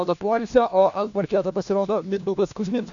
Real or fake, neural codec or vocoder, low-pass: fake; codec, 16 kHz, 1 kbps, FunCodec, trained on LibriTTS, 50 frames a second; 7.2 kHz